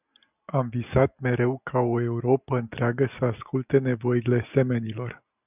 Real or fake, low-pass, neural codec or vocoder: real; 3.6 kHz; none